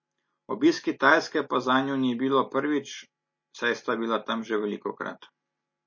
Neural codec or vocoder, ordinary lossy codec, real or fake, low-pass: none; MP3, 32 kbps; real; 7.2 kHz